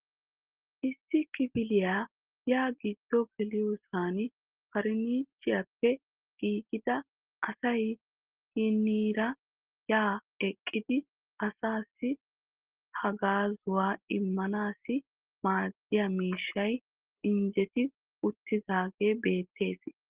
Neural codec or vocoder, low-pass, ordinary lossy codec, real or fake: none; 3.6 kHz; Opus, 16 kbps; real